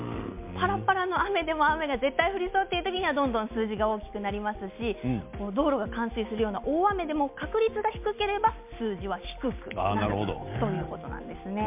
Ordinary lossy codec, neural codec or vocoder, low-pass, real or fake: MP3, 32 kbps; none; 3.6 kHz; real